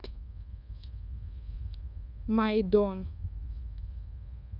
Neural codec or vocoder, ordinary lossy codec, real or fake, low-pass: codec, 24 kHz, 1.2 kbps, DualCodec; none; fake; 5.4 kHz